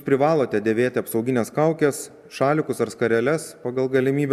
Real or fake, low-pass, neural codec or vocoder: real; 14.4 kHz; none